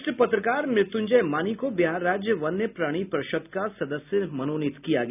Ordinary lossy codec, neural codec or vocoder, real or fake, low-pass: none; none; real; 3.6 kHz